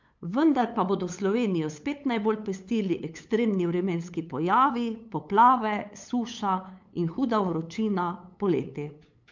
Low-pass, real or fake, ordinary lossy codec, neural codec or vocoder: 7.2 kHz; fake; MP3, 64 kbps; codec, 16 kHz, 8 kbps, FunCodec, trained on LibriTTS, 25 frames a second